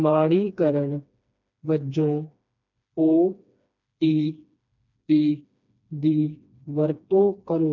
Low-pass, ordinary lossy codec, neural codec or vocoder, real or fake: 7.2 kHz; none; codec, 16 kHz, 2 kbps, FreqCodec, smaller model; fake